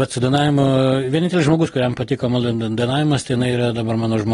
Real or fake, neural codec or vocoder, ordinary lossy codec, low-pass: real; none; AAC, 32 kbps; 10.8 kHz